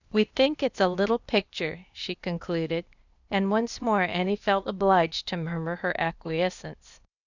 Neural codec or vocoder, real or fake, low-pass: codec, 16 kHz, 0.8 kbps, ZipCodec; fake; 7.2 kHz